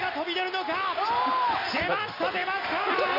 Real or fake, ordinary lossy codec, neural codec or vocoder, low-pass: real; none; none; 5.4 kHz